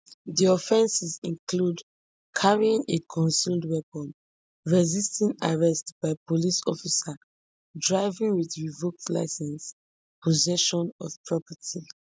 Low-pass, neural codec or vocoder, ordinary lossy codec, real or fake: none; none; none; real